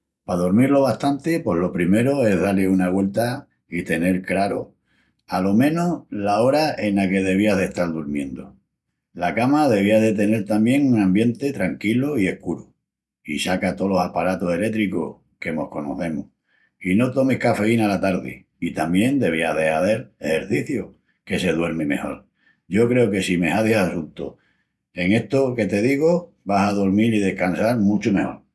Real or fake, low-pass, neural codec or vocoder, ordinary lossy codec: real; none; none; none